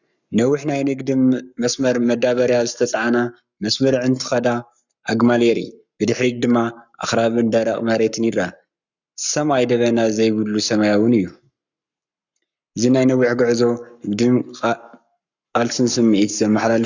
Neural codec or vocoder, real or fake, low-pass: codec, 44.1 kHz, 7.8 kbps, Pupu-Codec; fake; 7.2 kHz